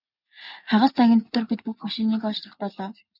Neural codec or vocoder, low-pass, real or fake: none; 5.4 kHz; real